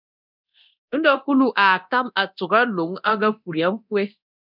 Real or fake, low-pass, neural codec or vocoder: fake; 5.4 kHz; codec, 24 kHz, 0.9 kbps, DualCodec